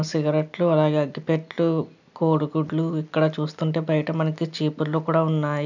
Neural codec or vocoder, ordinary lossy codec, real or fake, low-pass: none; none; real; 7.2 kHz